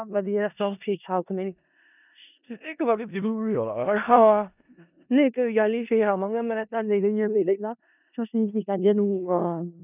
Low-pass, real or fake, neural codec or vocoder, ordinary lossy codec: 3.6 kHz; fake; codec, 16 kHz in and 24 kHz out, 0.4 kbps, LongCat-Audio-Codec, four codebook decoder; none